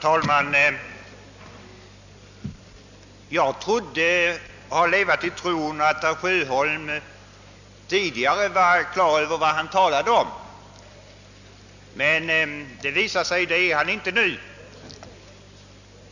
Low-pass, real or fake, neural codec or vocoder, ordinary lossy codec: 7.2 kHz; real; none; none